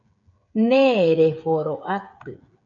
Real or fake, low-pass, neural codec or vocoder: fake; 7.2 kHz; codec, 16 kHz, 8 kbps, FreqCodec, smaller model